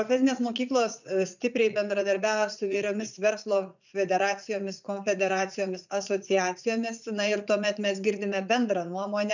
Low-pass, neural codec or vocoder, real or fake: 7.2 kHz; vocoder, 44.1 kHz, 80 mel bands, Vocos; fake